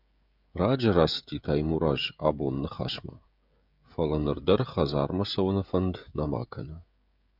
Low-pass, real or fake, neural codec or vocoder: 5.4 kHz; fake; codec, 16 kHz, 16 kbps, FreqCodec, smaller model